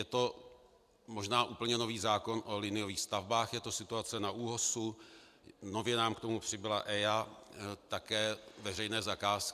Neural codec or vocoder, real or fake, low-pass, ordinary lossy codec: none; real; 14.4 kHz; MP3, 96 kbps